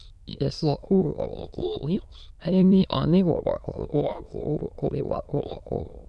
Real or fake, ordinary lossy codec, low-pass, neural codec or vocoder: fake; none; none; autoencoder, 22.05 kHz, a latent of 192 numbers a frame, VITS, trained on many speakers